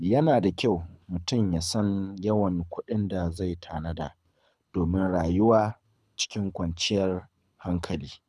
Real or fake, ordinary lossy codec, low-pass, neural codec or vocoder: fake; none; none; codec, 24 kHz, 6 kbps, HILCodec